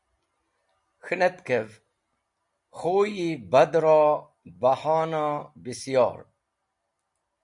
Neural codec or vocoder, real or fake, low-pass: none; real; 10.8 kHz